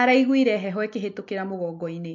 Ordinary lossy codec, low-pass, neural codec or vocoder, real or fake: MP3, 48 kbps; 7.2 kHz; none; real